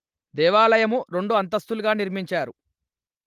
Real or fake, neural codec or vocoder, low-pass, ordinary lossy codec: real; none; 14.4 kHz; Opus, 24 kbps